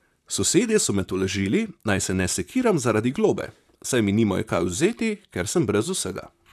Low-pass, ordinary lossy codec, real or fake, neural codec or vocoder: 14.4 kHz; none; fake; vocoder, 44.1 kHz, 128 mel bands, Pupu-Vocoder